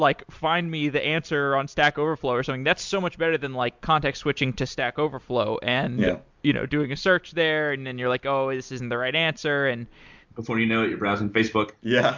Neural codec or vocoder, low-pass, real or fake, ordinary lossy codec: none; 7.2 kHz; real; MP3, 64 kbps